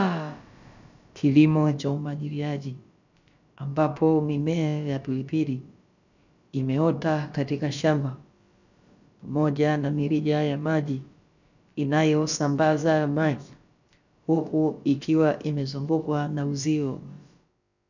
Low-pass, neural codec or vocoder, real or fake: 7.2 kHz; codec, 16 kHz, about 1 kbps, DyCAST, with the encoder's durations; fake